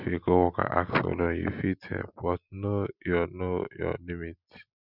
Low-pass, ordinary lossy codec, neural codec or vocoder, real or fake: 5.4 kHz; none; none; real